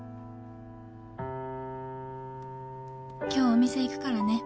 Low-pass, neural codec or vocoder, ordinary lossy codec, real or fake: none; none; none; real